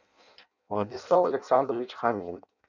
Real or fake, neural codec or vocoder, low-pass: fake; codec, 16 kHz in and 24 kHz out, 0.6 kbps, FireRedTTS-2 codec; 7.2 kHz